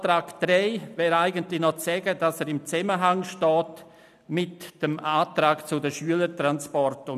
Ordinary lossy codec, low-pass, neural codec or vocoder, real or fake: none; 14.4 kHz; none; real